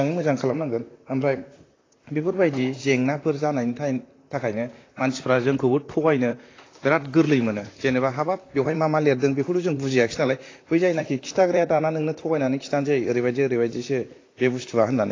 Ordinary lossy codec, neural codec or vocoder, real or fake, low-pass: AAC, 32 kbps; vocoder, 44.1 kHz, 128 mel bands, Pupu-Vocoder; fake; 7.2 kHz